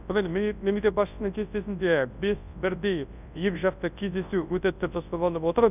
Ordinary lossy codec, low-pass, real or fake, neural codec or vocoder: none; 3.6 kHz; fake; codec, 24 kHz, 0.9 kbps, WavTokenizer, large speech release